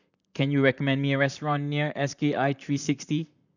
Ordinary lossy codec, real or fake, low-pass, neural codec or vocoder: none; fake; 7.2 kHz; vocoder, 44.1 kHz, 128 mel bands, Pupu-Vocoder